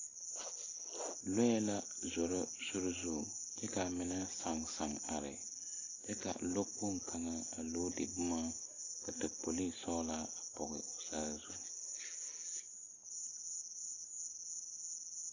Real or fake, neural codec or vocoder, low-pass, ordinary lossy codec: real; none; 7.2 kHz; AAC, 32 kbps